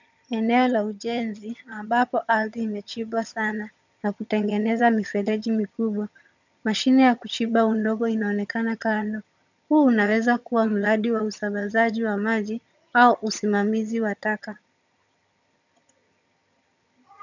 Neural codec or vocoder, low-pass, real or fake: vocoder, 22.05 kHz, 80 mel bands, HiFi-GAN; 7.2 kHz; fake